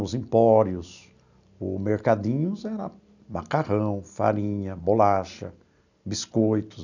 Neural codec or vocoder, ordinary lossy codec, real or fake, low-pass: none; none; real; 7.2 kHz